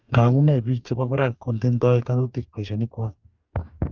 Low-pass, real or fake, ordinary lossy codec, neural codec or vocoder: 7.2 kHz; fake; Opus, 32 kbps; codec, 44.1 kHz, 2.6 kbps, DAC